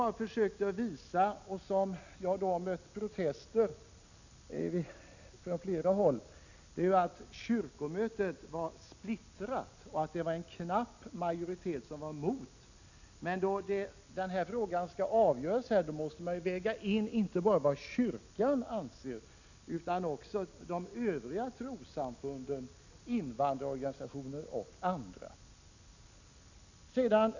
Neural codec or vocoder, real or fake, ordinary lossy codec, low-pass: none; real; none; 7.2 kHz